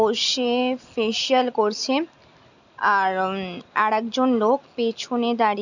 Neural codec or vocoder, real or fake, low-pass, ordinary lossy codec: none; real; 7.2 kHz; none